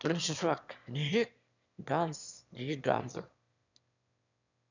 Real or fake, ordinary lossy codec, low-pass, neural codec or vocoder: fake; none; 7.2 kHz; autoencoder, 22.05 kHz, a latent of 192 numbers a frame, VITS, trained on one speaker